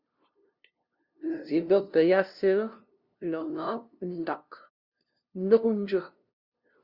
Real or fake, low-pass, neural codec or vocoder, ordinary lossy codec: fake; 5.4 kHz; codec, 16 kHz, 0.5 kbps, FunCodec, trained on LibriTTS, 25 frames a second; Opus, 64 kbps